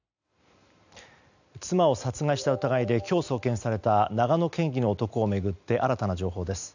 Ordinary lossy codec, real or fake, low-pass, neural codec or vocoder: none; real; 7.2 kHz; none